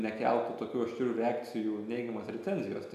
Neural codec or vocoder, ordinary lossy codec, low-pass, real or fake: none; AAC, 96 kbps; 14.4 kHz; real